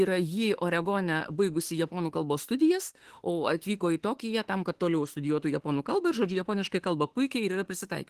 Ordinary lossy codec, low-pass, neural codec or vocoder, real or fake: Opus, 16 kbps; 14.4 kHz; autoencoder, 48 kHz, 32 numbers a frame, DAC-VAE, trained on Japanese speech; fake